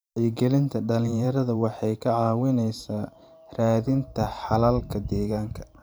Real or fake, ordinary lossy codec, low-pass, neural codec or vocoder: fake; none; none; vocoder, 44.1 kHz, 128 mel bands every 512 samples, BigVGAN v2